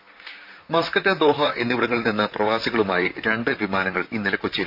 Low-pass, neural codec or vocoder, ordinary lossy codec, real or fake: 5.4 kHz; vocoder, 44.1 kHz, 128 mel bands, Pupu-Vocoder; none; fake